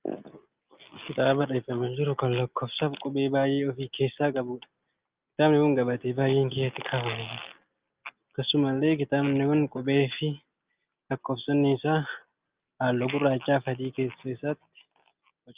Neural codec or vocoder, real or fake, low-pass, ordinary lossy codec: none; real; 3.6 kHz; Opus, 24 kbps